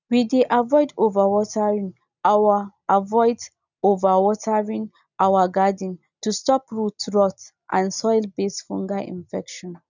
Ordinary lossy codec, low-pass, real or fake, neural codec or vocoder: none; 7.2 kHz; real; none